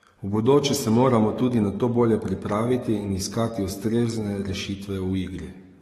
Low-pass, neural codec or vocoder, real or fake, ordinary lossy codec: 19.8 kHz; codec, 44.1 kHz, 7.8 kbps, DAC; fake; AAC, 32 kbps